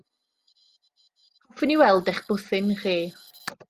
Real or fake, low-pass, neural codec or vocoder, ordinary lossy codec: real; 14.4 kHz; none; Opus, 32 kbps